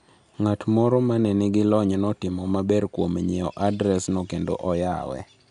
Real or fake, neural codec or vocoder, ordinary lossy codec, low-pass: real; none; none; 10.8 kHz